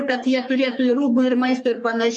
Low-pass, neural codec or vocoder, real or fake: 10.8 kHz; codec, 44.1 kHz, 3.4 kbps, Pupu-Codec; fake